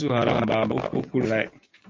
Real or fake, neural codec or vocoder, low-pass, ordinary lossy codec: real; none; 7.2 kHz; Opus, 24 kbps